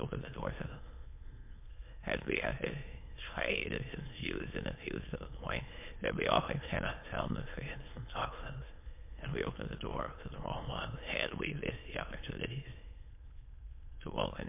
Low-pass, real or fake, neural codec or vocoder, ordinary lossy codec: 3.6 kHz; fake; autoencoder, 22.05 kHz, a latent of 192 numbers a frame, VITS, trained on many speakers; MP3, 24 kbps